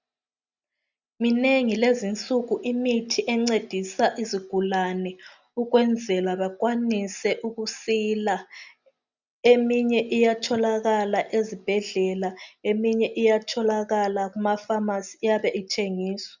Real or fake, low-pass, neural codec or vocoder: real; 7.2 kHz; none